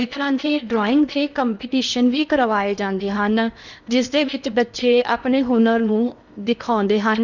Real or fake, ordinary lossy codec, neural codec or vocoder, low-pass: fake; none; codec, 16 kHz in and 24 kHz out, 0.8 kbps, FocalCodec, streaming, 65536 codes; 7.2 kHz